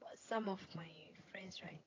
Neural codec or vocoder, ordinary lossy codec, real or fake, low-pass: vocoder, 22.05 kHz, 80 mel bands, HiFi-GAN; AAC, 32 kbps; fake; 7.2 kHz